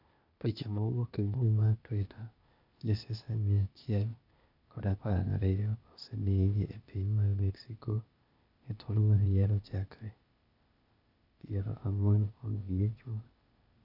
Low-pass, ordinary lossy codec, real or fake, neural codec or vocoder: 5.4 kHz; none; fake; codec, 16 kHz, 0.8 kbps, ZipCodec